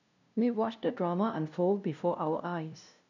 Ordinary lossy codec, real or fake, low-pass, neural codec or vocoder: none; fake; 7.2 kHz; codec, 16 kHz, 0.5 kbps, FunCodec, trained on LibriTTS, 25 frames a second